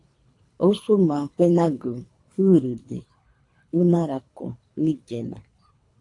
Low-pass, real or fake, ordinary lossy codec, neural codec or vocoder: 10.8 kHz; fake; AAC, 48 kbps; codec, 24 kHz, 3 kbps, HILCodec